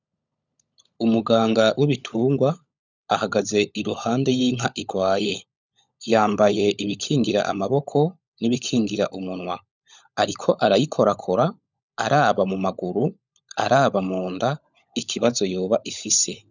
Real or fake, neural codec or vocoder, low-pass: fake; codec, 16 kHz, 16 kbps, FunCodec, trained on LibriTTS, 50 frames a second; 7.2 kHz